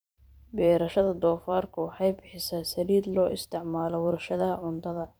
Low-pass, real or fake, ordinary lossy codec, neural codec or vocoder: none; fake; none; vocoder, 44.1 kHz, 128 mel bands every 512 samples, BigVGAN v2